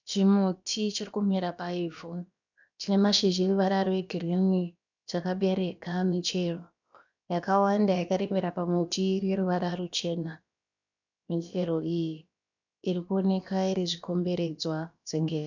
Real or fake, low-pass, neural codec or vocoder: fake; 7.2 kHz; codec, 16 kHz, about 1 kbps, DyCAST, with the encoder's durations